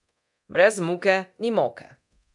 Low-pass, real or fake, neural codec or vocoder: 10.8 kHz; fake; codec, 24 kHz, 0.9 kbps, DualCodec